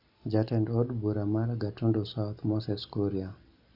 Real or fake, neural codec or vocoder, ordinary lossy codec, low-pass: real; none; none; 5.4 kHz